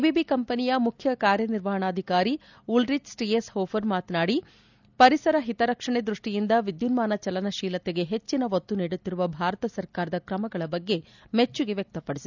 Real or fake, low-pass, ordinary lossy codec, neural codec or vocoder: real; 7.2 kHz; none; none